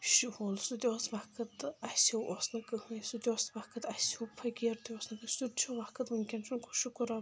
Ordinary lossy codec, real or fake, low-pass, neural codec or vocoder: none; real; none; none